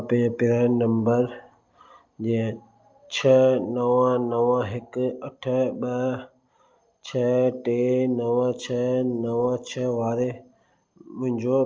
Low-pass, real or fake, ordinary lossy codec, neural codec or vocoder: 7.2 kHz; real; Opus, 24 kbps; none